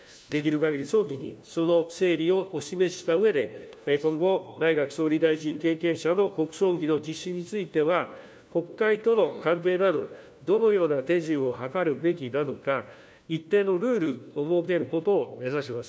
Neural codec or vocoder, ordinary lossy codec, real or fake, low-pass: codec, 16 kHz, 1 kbps, FunCodec, trained on LibriTTS, 50 frames a second; none; fake; none